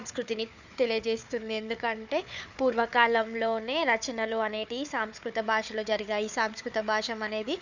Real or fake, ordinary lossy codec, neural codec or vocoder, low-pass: fake; none; codec, 16 kHz, 4 kbps, FunCodec, trained on Chinese and English, 50 frames a second; 7.2 kHz